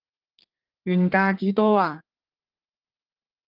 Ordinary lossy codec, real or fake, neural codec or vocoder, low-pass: Opus, 32 kbps; fake; codec, 32 kHz, 1.9 kbps, SNAC; 5.4 kHz